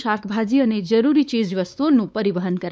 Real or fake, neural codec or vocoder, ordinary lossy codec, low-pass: fake; codec, 16 kHz, 4 kbps, X-Codec, WavLM features, trained on Multilingual LibriSpeech; none; none